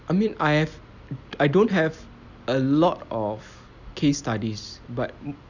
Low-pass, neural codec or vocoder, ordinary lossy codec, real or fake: 7.2 kHz; none; none; real